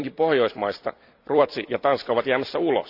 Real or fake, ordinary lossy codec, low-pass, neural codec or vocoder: fake; Opus, 64 kbps; 5.4 kHz; vocoder, 44.1 kHz, 128 mel bands every 256 samples, BigVGAN v2